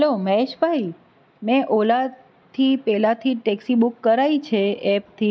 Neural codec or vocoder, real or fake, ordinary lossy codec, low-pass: none; real; none; none